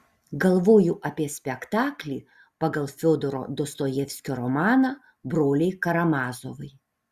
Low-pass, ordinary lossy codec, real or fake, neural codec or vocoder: 14.4 kHz; Opus, 64 kbps; real; none